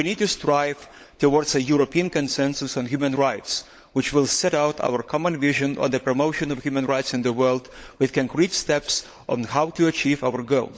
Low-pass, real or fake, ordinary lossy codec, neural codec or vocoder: none; fake; none; codec, 16 kHz, 16 kbps, FunCodec, trained on LibriTTS, 50 frames a second